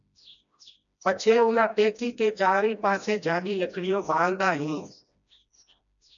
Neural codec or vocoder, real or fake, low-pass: codec, 16 kHz, 1 kbps, FreqCodec, smaller model; fake; 7.2 kHz